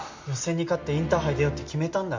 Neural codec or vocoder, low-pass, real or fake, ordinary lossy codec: none; 7.2 kHz; real; MP3, 64 kbps